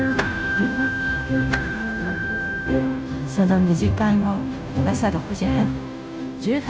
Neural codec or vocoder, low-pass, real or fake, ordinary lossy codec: codec, 16 kHz, 0.5 kbps, FunCodec, trained on Chinese and English, 25 frames a second; none; fake; none